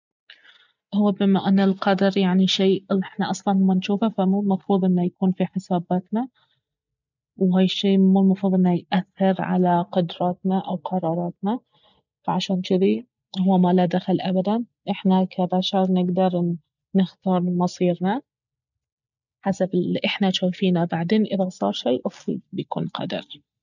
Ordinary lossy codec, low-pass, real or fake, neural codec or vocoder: none; 7.2 kHz; real; none